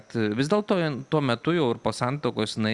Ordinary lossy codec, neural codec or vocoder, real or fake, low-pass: Opus, 64 kbps; none; real; 10.8 kHz